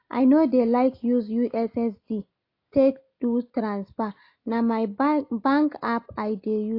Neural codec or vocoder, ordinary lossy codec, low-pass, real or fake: none; AAC, 32 kbps; 5.4 kHz; real